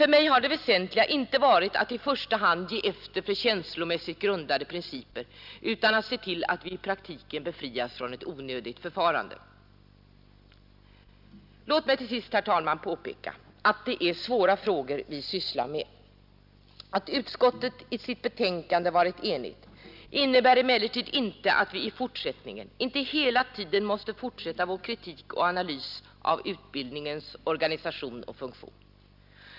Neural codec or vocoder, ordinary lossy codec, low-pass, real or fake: none; none; 5.4 kHz; real